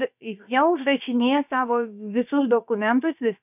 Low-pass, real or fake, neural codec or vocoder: 3.6 kHz; fake; codec, 16 kHz, about 1 kbps, DyCAST, with the encoder's durations